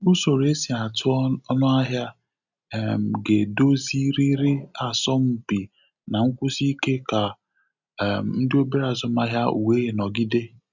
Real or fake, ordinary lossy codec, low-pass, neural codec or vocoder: real; none; 7.2 kHz; none